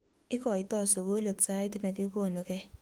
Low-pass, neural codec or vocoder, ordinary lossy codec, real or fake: 19.8 kHz; autoencoder, 48 kHz, 32 numbers a frame, DAC-VAE, trained on Japanese speech; Opus, 24 kbps; fake